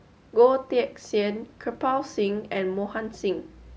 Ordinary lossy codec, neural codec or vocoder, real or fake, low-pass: none; none; real; none